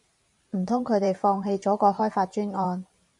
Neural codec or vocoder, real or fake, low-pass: vocoder, 44.1 kHz, 128 mel bands every 512 samples, BigVGAN v2; fake; 10.8 kHz